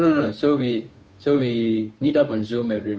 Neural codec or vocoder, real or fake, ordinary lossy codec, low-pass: codec, 16 kHz, 2 kbps, FunCodec, trained on Chinese and English, 25 frames a second; fake; none; none